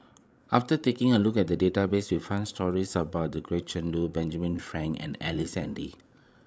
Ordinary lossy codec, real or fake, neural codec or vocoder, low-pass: none; fake; codec, 16 kHz, 16 kbps, FreqCodec, larger model; none